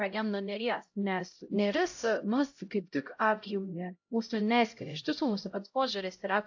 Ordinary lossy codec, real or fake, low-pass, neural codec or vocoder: AAC, 48 kbps; fake; 7.2 kHz; codec, 16 kHz, 0.5 kbps, X-Codec, HuBERT features, trained on LibriSpeech